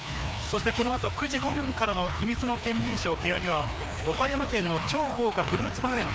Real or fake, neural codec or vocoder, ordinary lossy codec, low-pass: fake; codec, 16 kHz, 2 kbps, FreqCodec, larger model; none; none